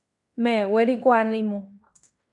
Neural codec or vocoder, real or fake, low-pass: codec, 16 kHz in and 24 kHz out, 0.9 kbps, LongCat-Audio-Codec, fine tuned four codebook decoder; fake; 10.8 kHz